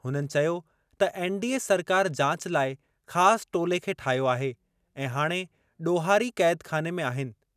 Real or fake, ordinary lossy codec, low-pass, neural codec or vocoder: real; none; 14.4 kHz; none